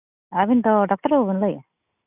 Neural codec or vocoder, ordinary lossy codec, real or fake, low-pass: none; none; real; 3.6 kHz